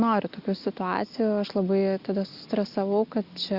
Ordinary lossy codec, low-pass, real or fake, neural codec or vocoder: Opus, 64 kbps; 5.4 kHz; real; none